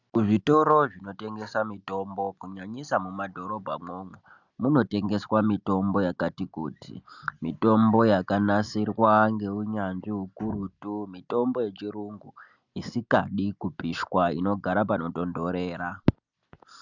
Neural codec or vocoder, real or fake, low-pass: none; real; 7.2 kHz